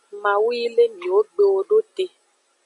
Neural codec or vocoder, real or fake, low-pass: none; real; 10.8 kHz